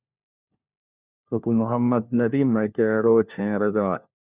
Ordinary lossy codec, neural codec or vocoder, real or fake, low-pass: Opus, 64 kbps; codec, 16 kHz, 1 kbps, FunCodec, trained on LibriTTS, 50 frames a second; fake; 3.6 kHz